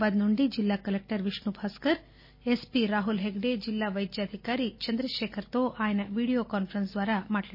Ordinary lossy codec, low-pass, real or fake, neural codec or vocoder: MP3, 24 kbps; 5.4 kHz; real; none